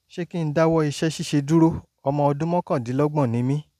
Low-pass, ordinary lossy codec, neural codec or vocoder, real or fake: 14.4 kHz; none; none; real